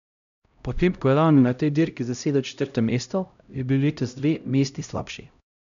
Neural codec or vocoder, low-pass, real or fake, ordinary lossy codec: codec, 16 kHz, 0.5 kbps, X-Codec, HuBERT features, trained on LibriSpeech; 7.2 kHz; fake; none